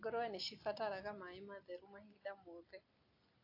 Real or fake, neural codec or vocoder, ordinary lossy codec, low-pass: real; none; AAC, 32 kbps; 5.4 kHz